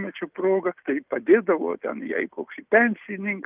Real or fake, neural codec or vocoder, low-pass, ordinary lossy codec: real; none; 3.6 kHz; Opus, 32 kbps